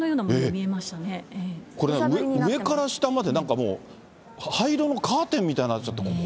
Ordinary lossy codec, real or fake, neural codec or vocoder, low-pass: none; real; none; none